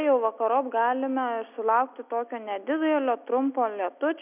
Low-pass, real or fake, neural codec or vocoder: 3.6 kHz; real; none